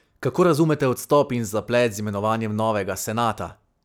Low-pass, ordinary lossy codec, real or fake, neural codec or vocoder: none; none; real; none